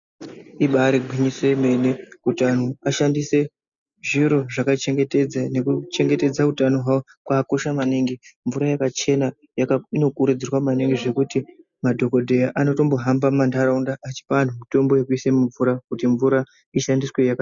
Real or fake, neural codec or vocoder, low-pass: real; none; 7.2 kHz